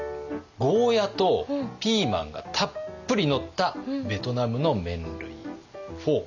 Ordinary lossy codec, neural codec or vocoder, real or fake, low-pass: none; none; real; 7.2 kHz